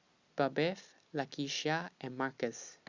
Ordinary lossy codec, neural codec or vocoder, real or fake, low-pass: Opus, 64 kbps; none; real; 7.2 kHz